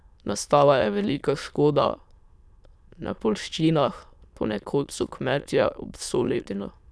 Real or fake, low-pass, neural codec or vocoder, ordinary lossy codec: fake; none; autoencoder, 22.05 kHz, a latent of 192 numbers a frame, VITS, trained on many speakers; none